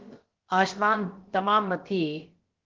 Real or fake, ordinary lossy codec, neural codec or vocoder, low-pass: fake; Opus, 16 kbps; codec, 16 kHz, about 1 kbps, DyCAST, with the encoder's durations; 7.2 kHz